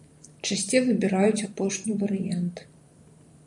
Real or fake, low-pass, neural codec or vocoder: fake; 10.8 kHz; vocoder, 44.1 kHz, 128 mel bands every 512 samples, BigVGAN v2